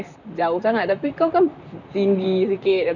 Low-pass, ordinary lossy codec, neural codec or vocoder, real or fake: 7.2 kHz; none; vocoder, 44.1 kHz, 128 mel bands, Pupu-Vocoder; fake